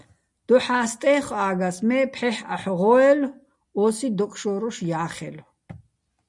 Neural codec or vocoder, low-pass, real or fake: none; 10.8 kHz; real